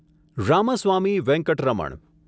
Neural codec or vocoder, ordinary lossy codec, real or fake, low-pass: none; none; real; none